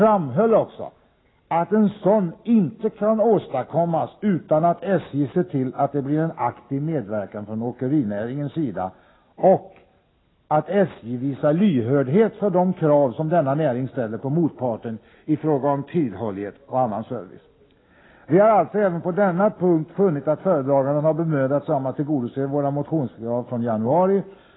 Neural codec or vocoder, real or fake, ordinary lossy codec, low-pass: none; real; AAC, 16 kbps; 7.2 kHz